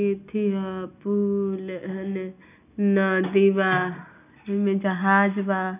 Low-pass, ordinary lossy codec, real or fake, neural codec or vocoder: 3.6 kHz; none; real; none